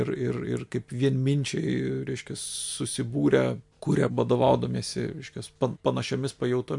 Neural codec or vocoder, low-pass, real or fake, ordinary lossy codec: none; 10.8 kHz; real; MP3, 64 kbps